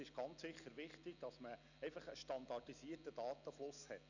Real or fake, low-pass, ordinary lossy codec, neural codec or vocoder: real; 7.2 kHz; none; none